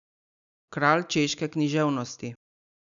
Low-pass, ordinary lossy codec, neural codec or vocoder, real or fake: 7.2 kHz; none; none; real